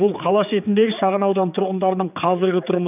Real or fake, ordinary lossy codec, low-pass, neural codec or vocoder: fake; none; 3.6 kHz; codec, 16 kHz, 4 kbps, X-Codec, HuBERT features, trained on general audio